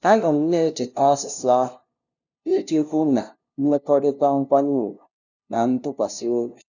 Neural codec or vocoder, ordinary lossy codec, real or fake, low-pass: codec, 16 kHz, 0.5 kbps, FunCodec, trained on LibriTTS, 25 frames a second; none; fake; 7.2 kHz